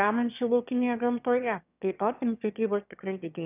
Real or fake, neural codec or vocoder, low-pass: fake; autoencoder, 22.05 kHz, a latent of 192 numbers a frame, VITS, trained on one speaker; 3.6 kHz